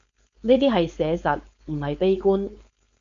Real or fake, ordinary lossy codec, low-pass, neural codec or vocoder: fake; MP3, 64 kbps; 7.2 kHz; codec, 16 kHz, 4.8 kbps, FACodec